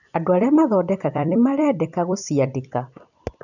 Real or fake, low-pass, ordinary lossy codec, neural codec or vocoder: fake; 7.2 kHz; none; vocoder, 44.1 kHz, 128 mel bands every 256 samples, BigVGAN v2